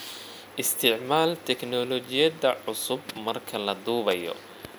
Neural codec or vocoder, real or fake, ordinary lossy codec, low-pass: none; real; none; none